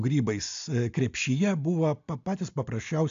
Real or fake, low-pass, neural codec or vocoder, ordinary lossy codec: real; 7.2 kHz; none; AAC, 64 kbps